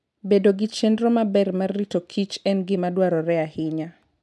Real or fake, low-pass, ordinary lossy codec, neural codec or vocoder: real; none; none; none